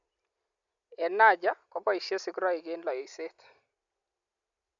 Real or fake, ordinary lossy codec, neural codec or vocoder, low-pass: real; none; none; 7.2 kHz